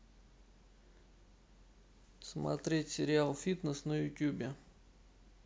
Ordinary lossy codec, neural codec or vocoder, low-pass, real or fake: none; none; none; real